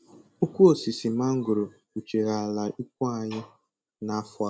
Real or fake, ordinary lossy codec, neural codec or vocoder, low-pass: real; none; none; none